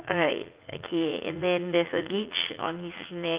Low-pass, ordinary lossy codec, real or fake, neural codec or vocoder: 3.6 kHz; Opus, 32 kbps; fake; vocoder, 44.1 kHz, 80 mel bands, Vocos